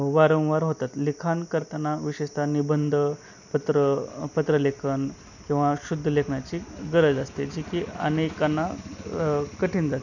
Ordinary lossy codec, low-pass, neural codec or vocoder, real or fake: none; 7.2 kHz; none; real